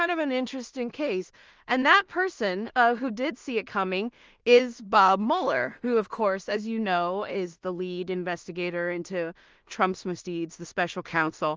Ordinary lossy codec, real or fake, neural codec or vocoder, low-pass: Opus, 24 kbps; fake; codec, 16 kHz in and 24 kHz out, 0.4 kbps, LongCat-Audio-Codec, two codebook decoder; 7.2 kHz